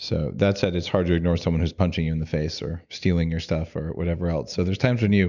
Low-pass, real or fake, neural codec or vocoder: 7.2 kHz; real; none